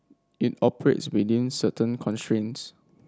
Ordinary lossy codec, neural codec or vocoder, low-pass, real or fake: none; none; none; real